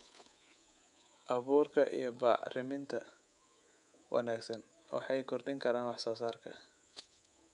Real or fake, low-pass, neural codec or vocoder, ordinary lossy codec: fake; 10.8 kHz; codec, 24 kHz, 3.1 kbps, DualCodec; none